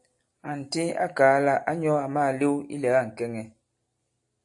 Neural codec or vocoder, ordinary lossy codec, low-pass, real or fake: none; AAC, 64 kbps; 10.8 kHz; real